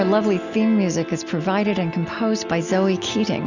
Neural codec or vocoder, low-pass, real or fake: none; 7.2 kHz; real